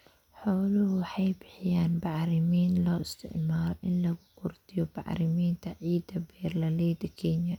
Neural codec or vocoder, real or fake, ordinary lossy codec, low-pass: none; real; none; 19.8 kHz